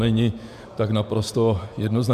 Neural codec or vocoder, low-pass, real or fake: vocoder, 48 kHz, 128 mel bands, Vocos; 14.4 kHz; fake